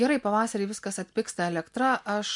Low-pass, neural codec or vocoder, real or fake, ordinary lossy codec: 10.8 kHz; none; real; MP3, 64 kbps